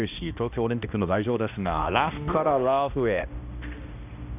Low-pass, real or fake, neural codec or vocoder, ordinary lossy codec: 3.6 kHz; fake; codec, 16 kHz, 1 kbps, X-Codec, HuBERT features, trained on balanced general audio; none